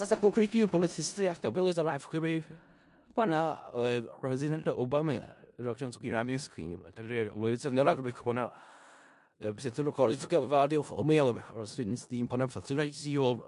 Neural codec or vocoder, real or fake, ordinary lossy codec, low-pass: codec, 16 kHz in and 24 kHz out, 0.4 kbps, LongCat-Audio-Codec, four codebook decoder; fake; MP3, 64 kbps; 10.8 kHz